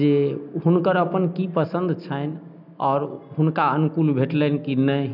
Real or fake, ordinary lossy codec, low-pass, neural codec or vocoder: real; none; 5.4 kHz; none